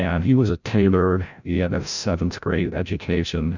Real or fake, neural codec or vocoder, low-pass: fake; codec, 16 kHz, 0.5 kbps, FreqCodec, larger model; 7.2 kHz